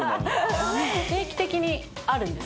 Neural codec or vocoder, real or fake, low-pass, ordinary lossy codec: none; real; none; none